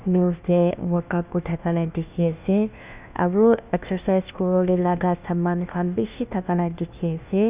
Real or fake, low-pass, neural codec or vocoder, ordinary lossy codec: fake; 3.6 kHz; codec, 16 kHz, 1 kbps, FunCodec, trained on LibriTTS, 50 frames a second; Opus, 64 kbps